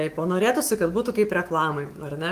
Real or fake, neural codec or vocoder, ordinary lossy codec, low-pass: fake; autoencoder, 48 kHz, 128 numbers a frame, DAC-VAE, trained on Japanese speech; Opus, 24 kbps; 14.4 kHz